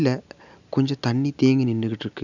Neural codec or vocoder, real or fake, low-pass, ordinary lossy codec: none; real; 7.2 kHz; none